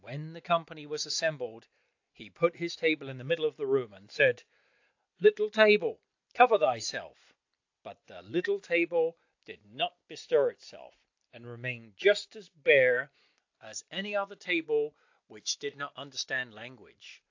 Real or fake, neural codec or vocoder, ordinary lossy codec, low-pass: real; none; AAC, 48 kbps; 7.2 kHz